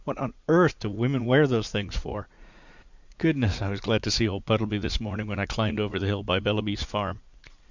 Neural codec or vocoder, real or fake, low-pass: vocoder, 44.1 kHz, 80 mel bands, Vocos; fake; 7.2 kHz